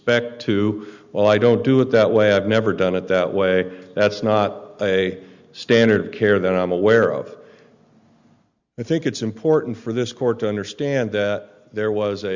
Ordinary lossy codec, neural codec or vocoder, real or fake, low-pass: Opus, 64 kbps; none; real; 7.2 kHz